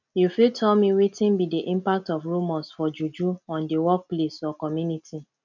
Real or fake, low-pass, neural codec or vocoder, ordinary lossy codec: real; 7.2 kHz; none; none